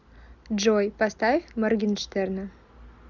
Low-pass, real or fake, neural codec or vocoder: 7.2 kHz; real; none